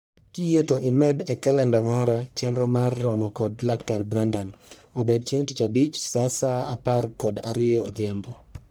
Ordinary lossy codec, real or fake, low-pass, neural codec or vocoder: none; fake; none; codec, 44.1 kHz, 1.7 kbps, Pupu-Codec